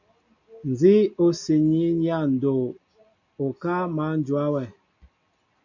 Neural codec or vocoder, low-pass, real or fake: none; 7.2 kHz; real